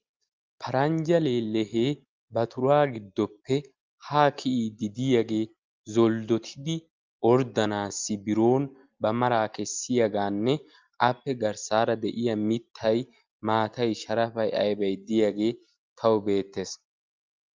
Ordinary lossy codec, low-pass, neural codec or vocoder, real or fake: Opus, 24 kbps; 7.2 kHz; none; real